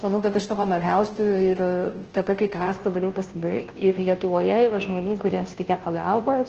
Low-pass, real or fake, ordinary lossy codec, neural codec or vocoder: 7.2 kHz; fake; Opus, 16 kbps; codec, 16 kHz, 0.5 kbps, FunCodec, trained on Chinese and English, 25 frames a second